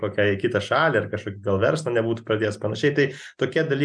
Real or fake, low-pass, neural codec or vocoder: real; 9.9 kHz; none